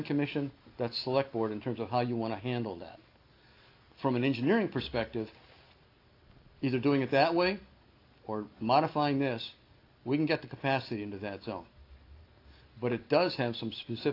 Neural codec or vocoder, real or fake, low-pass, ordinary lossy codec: none; real; 5.4 kHz; AAC, 32 kbps